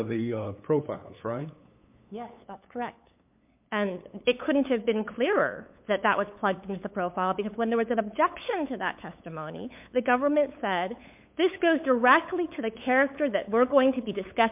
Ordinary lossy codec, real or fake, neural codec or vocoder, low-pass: MP3, 32 kbps; fake; codec, 16 kHz, 8 kbps, FunCodec, trained on LibriTTS, 25 frames a second; 3.6 kHz